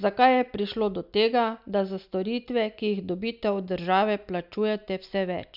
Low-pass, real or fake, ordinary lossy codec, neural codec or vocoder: 5.4 kHz; real; none; none